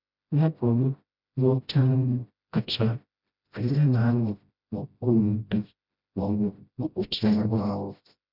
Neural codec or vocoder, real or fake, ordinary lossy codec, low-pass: codec, 16 kHz, 0.5 kbps, FreqCodec, smaller model; fake; none; 5.4 kHz